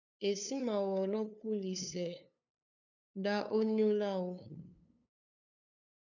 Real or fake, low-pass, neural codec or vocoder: fake; 7.2 kHz; codec, 16 kHz, 2 kbps, FunCodec, trained on LibriTTS, 25 frames a second